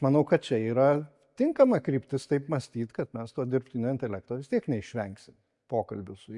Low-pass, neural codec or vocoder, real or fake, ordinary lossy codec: 10.8 kHz; none; real; MP3, 64 kbps